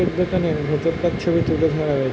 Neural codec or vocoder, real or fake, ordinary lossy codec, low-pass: none; real; none; none